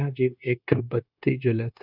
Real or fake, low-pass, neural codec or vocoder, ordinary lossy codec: fake; 5.4 kHz; codec, 16 kHz, 0.9 kbps, LongCat-Audio-Codec; none